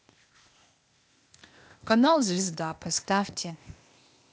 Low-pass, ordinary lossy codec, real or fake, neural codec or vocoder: none; none; fake; codec, 16 kHz, 0.8 kbps, ZipCodec